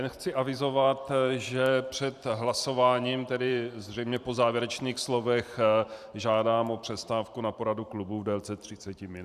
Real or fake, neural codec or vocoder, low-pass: fake; vocoder, 44.1 kHz, 128 mel bands every 512 samples, BigVGAN v2; 14.4 kHz